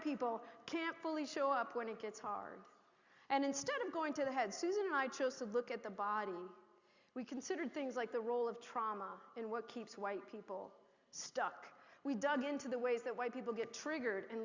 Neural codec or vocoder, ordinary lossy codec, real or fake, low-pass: none; Opus, 64 kbps; real; 7.2 kHz